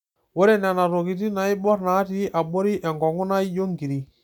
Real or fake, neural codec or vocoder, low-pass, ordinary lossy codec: real; none; 19.8 kHz; none